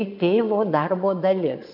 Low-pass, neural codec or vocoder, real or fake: 5.4 kHz; vocoder, 22.05 kHz, 80 mel bands, Vocos; fake